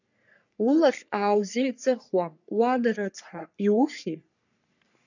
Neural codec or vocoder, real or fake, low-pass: codec, 44.1 kHz, 3.4 kbps, Pupu-Codec; fake; 7.2 kHz